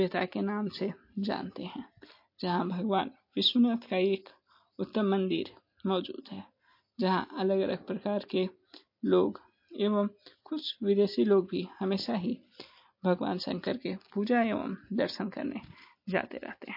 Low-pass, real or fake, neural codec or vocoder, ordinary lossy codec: 5.4 kHz; real; none; MP3, 32 kbps